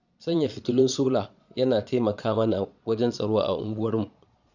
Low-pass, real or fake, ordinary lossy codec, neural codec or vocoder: 7.2 kHz; fake; none; vocoder, 22.05 kHz, 80 mel bands, Vocos